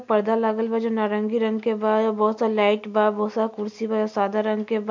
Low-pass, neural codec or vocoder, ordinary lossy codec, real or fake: 7.2 kHz; none; MP3, 48 kbps; real